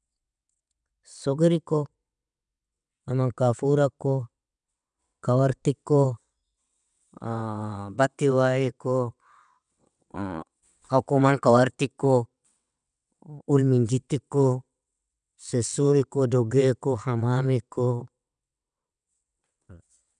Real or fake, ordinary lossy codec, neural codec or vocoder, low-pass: fake; none; vocoder, 22.05 kHz, 80 mel bands, WaveNeXt; 9.9 kHz